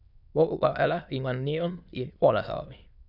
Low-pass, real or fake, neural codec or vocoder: 5.4 kHz; fake; autoencoder, 22.05 kHz, a latent of 192 numbers a frame, VITS, trained on many speakers